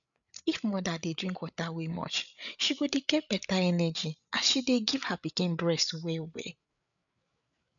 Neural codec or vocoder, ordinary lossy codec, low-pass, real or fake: codec, 16 kHz, 8 kbps, FreqCodec, larger model; MP3, 96 kbps; 7.2 kHz; fake